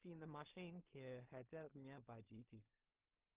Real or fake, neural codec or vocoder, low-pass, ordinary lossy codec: fake; codec, 16 kHz in and 24 kHz out, 0.4 kbps, LongCat-Audio-Codec, two codebook decoder; 3.6 kHz; Opus, 32 kbps